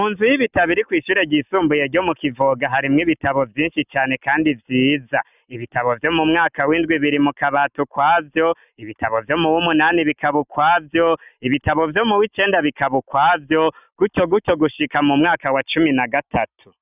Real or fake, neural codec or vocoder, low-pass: real; none; 3.6 kHz